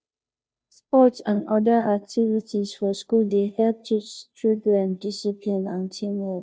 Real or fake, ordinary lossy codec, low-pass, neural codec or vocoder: fake; none; none; codec, 16 kHz, 0.5 kbps, FunCodec, trained on Chinese and English, 25 frames a second